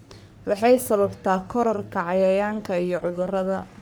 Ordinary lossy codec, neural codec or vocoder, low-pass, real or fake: none; codec, 44.1 kHz, 3.4 kbps, Pupu-Codec; none; fake